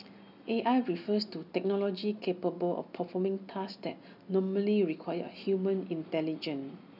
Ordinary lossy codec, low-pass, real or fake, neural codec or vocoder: none; 5.4 kHz; real; none